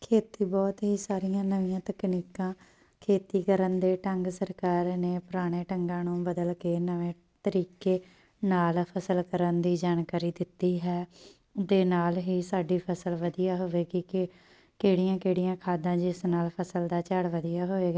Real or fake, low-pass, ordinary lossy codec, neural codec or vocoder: real; none; none; none